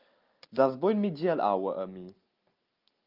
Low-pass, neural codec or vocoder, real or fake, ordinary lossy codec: 5.4 kHz; none; real; Opus, 24 kbps